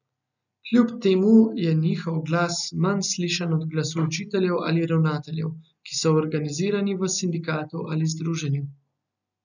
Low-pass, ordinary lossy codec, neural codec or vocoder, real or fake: 7.2 kHz; none; none; real